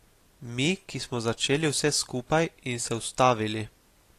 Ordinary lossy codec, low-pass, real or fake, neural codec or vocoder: AAC, 48 kbps; 14.4 kHz; real; none